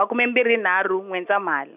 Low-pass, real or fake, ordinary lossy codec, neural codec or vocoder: 3.6 kHz; real; none; none